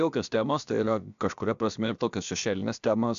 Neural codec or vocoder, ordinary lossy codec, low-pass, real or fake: codec, 16 kHz, about 1 kbps, DyCAST, with the encoder's durations; AAC, 96 kbps; 7.2 kHz; fake